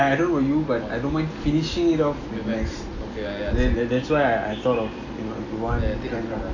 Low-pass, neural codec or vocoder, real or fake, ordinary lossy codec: 7.2 kHz; none; real; AAC, 48 kbps